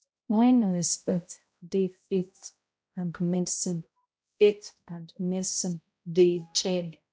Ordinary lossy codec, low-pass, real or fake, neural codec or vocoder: none; none; fake; codec, 16 kHz, 0.5 kbps, X-Codec, HuBERT features, trained on balanced general audio